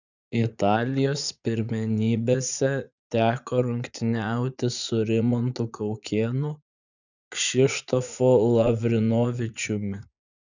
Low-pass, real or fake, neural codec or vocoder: 7.2 kHz; fake; vocoder, 44.1 kHz, 80 mel bands, Vocos